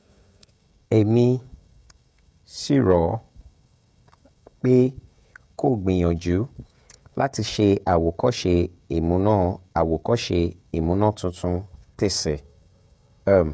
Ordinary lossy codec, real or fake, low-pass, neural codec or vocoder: none; fake; none; codec, 16 kHz, 16 kbps, FreqCodec, smaller model